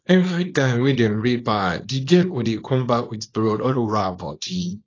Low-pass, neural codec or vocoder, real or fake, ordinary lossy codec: 7.2 kHz; codec, 24 kHz, 0.9 kbps, WavTokenizer, small release; fake; AAC, 32 kbps